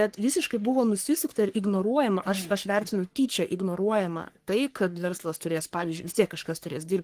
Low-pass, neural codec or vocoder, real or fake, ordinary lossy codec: 14.4 kHz; codec, 44.1 kHz, 3.4 kbps, Pupu-Codec; fake; Opus, 24 kbps